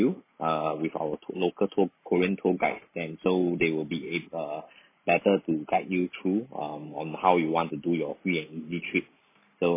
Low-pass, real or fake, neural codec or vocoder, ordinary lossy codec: 3.6 kHz; real; none; MP3, 24 kbps